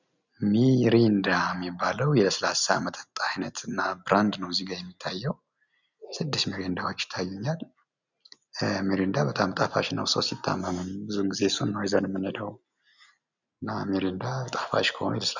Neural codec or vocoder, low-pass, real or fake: none; 7.2 kHz; real